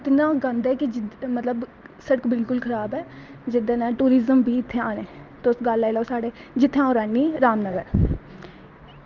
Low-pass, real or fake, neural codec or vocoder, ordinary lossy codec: 7.2 kHz; real; none; Opus, 32 kbps